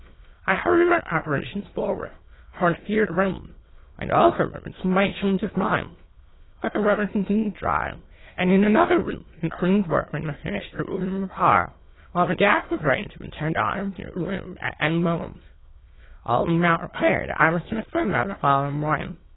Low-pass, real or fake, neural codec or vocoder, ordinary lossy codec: 7.2 kHz; fake; autoencoder, 22.05 kHz, a latent of 192 numbers a frame, VITS, trained on many speakers; AAC, 16 kbps